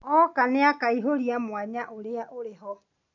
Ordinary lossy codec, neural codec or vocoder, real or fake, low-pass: none; none; real; 7.2 kHz